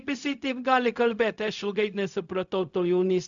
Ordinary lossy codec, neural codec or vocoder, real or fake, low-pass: MP3, 96 kbps; codec, 16 kHz, 0.4 kbps, LongCat-Audio-Codec; fake; 7.2 kHz